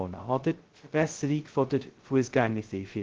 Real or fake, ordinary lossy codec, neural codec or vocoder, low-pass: fake; Opus, 16 kbps; codec, 16 kHz, 0.2 kbps, FocalCodec; 7.2 kHz